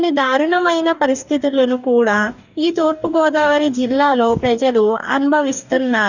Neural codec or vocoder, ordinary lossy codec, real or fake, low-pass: codec, 44.1 kHz, 2.6 kbps, DAC; none; fake; 7.2 kHz